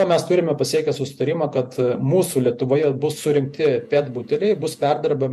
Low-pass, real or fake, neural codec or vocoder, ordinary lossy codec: 14.4 kHz; fake; vocoder, 48 kHz, 128 mel bands, Vocos; MP3, 64 kbps